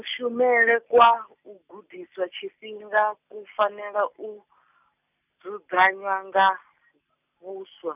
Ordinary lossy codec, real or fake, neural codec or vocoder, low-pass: none; real; none; 3.6 kHz